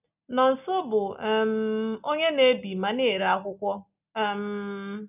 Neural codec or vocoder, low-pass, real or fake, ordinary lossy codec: none; 3.6 kHz; real; none